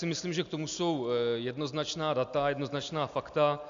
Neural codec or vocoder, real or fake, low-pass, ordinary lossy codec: none; real; 7.2 kHz; AAC, 64 kbps